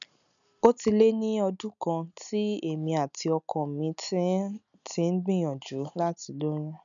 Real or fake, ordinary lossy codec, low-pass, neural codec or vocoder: real; none; 7.2 kHz; none